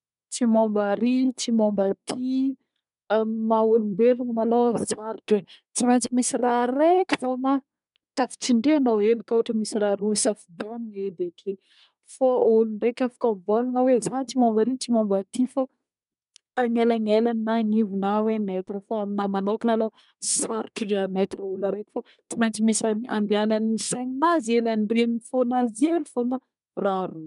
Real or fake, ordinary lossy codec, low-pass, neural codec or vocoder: fake; none; 10.8 kHz; codec, 24 kHz, 1 kbps, SNAC